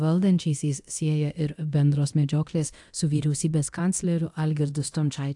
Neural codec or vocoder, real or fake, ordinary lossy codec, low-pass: codec, 24 kHz, 0.9 kbps, DualCodec; fake; AAC, 64 kbps; 10.8 kHz